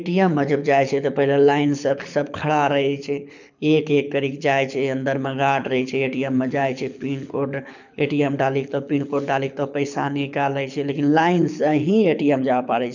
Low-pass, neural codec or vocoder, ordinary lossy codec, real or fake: 7.2 kHz; codec, 24 kHz, 6 kbps, HILCodec; none; fake